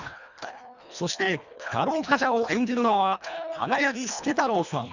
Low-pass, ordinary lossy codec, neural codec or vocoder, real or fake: 7.2 kHz; none; codec, 24 kHz, 1.5 kbps, HILCodec; fake